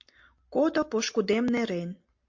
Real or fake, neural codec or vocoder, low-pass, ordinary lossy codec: real; none; 7.2 kHz; MP3, 48 kbps